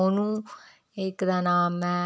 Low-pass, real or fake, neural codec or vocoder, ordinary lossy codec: none; real; none; none